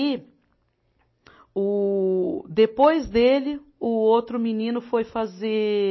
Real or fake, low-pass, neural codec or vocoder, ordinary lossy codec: real; 7.2 kHz; none; MP3, 24 kbps